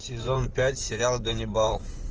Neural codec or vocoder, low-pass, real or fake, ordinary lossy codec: codec, 16 kHz in and 24 kHz out, 2.2 kbps, FireRedTTS-2 codec; 7.2 kHz; fake; Opus, 24 kbps